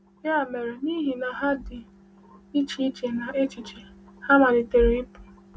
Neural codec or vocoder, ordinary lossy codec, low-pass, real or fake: none; none; none; real